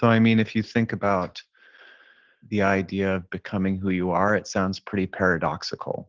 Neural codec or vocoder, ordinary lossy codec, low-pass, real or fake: none; Opus, 16 kbps; 7.2 kHz; real